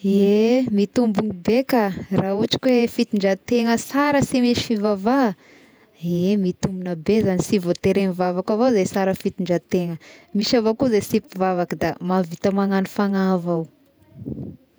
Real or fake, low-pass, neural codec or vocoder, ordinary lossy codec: fake; none; vocoder, 48 kHz, 128 mel bands, Vocos; none